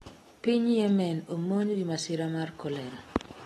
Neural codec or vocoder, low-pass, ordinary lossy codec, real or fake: none; 19.8 kHz; AAC, 32 kbps; real